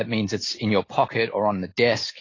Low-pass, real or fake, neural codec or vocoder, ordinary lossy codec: 7.2 kHz; real; none; AAC, 32 kbps